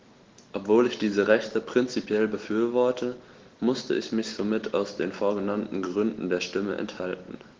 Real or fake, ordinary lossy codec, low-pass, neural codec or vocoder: real; Opus, 16 kbps; 7.2 kHz; none